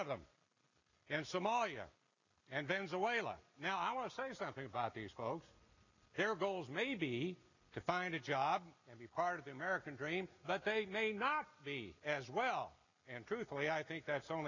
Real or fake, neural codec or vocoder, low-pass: real; none; 7.2 kHz